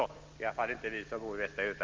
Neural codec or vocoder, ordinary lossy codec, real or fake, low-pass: none; Opus, 32 kbps; real; 7.2 kHz